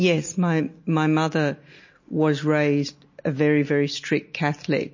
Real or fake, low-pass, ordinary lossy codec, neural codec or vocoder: real; 7.2 kHz; MP3, 32 kbps; none